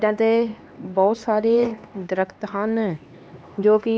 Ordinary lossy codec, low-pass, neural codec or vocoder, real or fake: none; none; codec, 16 kHz, 2 kbps, X-Codec, HuBERT features, trained on LibriSpeech; fake